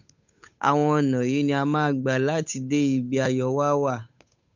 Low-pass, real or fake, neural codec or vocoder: 7.2 kHz; fake; codec, 16 kHz, 8 kbps, FunCodec, trained on Chinese and English, 25 frames a second